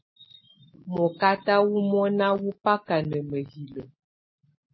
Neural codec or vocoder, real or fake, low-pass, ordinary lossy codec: none; real; 7.2 kHz; MP3, 24 kbps